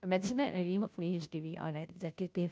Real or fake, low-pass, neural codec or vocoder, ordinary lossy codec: fake; none; codec, 16 kHz, 0.5 kbps, FunCodec, trained on Chinese and English, 25 frames a second; none